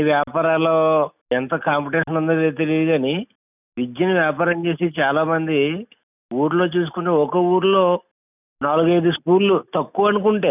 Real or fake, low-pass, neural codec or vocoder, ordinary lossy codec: real; 3.6 kHz; none; none